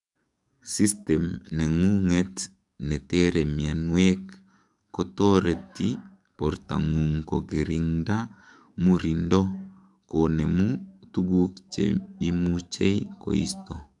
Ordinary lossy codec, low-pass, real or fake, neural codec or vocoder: none; 10.8 kHz; fake; codec, 44.1 kHz, 7.8 kbps, DAC